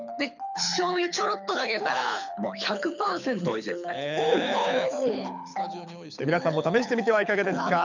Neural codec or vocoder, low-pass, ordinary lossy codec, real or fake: codec, 24 kHz, 6 kbps, HILCodec; 7.2 kHz; none; fake